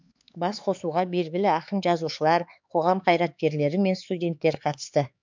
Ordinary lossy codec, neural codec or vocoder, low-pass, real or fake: MP3, 64 kbps; codec, 16 kHz, 4 kbps, X-Codec, HuBERT features, trained on LibriSpeech; 7.2 kHz; fake